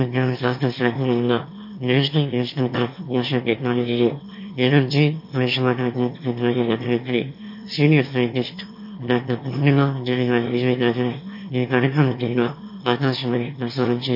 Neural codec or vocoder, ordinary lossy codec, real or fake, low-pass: autoencoder, 22.05 kHz, a latent of 192 numbers a frame, VITS, trained on one speaker; MP3, 32 kbps; fake; 5.4 kHz